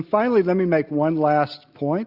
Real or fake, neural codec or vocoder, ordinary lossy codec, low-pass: real; none; Opus, 64 kbps; 5.4 kHz